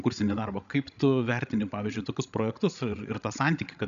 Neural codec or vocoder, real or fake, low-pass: codec, 16 kHz, 16 kbps, FreqCodec, larger model; fake; 7.2 kHz